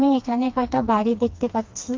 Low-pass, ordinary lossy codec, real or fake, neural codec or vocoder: 7.2 kHz; Opus, 24 kbps; fake; codec, 16 kHz, 2 kbps, FreqCodec, smaller model